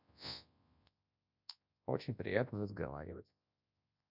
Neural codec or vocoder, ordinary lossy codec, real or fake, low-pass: codec, 24 kHz, 0.9 kbps, WavTokenizer, large speech release; none; fake; 5.4 kHz